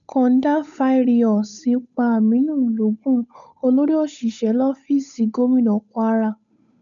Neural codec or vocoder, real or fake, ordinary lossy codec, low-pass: codec, 16 kHz, 8 kbps, FunCodec, trained on Chinese and English, 25 frames a second; fake; none; 7.2 kHz